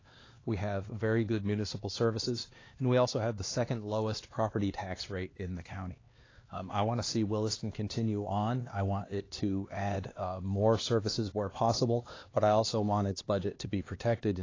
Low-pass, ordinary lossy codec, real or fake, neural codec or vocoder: 7.2 kHz; AAC, 32 kbps; fake; codec, 16 kHz, 2 kbps, X-Codec, HuBERT features, trained on LibriSpeech